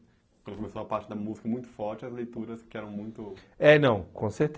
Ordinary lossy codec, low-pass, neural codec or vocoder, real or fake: none; none; none; real